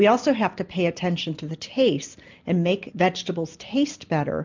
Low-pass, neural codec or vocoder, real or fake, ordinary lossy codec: 7.2 kHz; none; real; MP3, 64 kbps